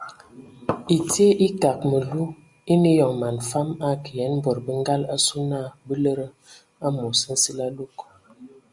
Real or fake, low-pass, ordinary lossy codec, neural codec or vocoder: real; 10.8 kHz; Opus, 64 kbps; none